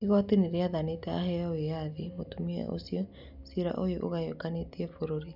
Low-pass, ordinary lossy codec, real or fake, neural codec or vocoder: 5.4 kHz; none; real; none